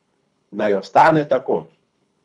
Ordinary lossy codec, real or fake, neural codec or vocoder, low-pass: none; fake; codec, 24 kHz, 3 kbps, HILCodec; 10.8 kHz